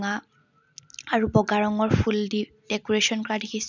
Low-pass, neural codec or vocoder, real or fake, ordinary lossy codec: 7.2 kHz; none; real; none